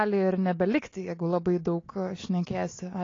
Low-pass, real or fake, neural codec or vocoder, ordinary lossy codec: 7.2 kHz; real; none; AAC, 32 kbps